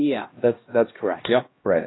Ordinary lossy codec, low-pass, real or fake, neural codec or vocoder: AAC, 16 kbps; 7.2 kHz; fake; codec, 16 kHz, 1 kbps, X-Codec, HuBERT features, trained on LibriSpeech